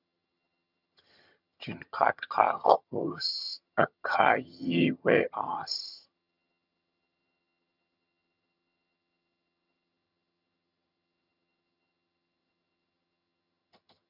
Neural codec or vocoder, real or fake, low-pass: vocoder, 22.05 kHz, 80 mel bands, HiFi-GAN; fake; 5.4 kHz